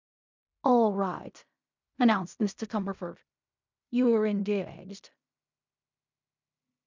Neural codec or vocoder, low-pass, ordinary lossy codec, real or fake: codec, 16 kHz in and 24 kHz out, 0.4 kbps, LongCat-Audio-Codec, fine tuned four codebook decoder; 7.2 kHz; MP3, 64 kbps; fake